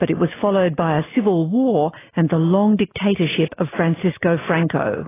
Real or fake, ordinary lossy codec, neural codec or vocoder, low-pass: real; AAC, 16 kbps; none; 3.6 kHz